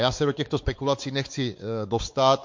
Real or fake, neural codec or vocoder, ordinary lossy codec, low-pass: fake; codec, 16 kHz, 4 kbps, X-Codec, WavLM features, trained on Multilingual LibriSpeech; MP3, 48 kbps; 7.2 kHz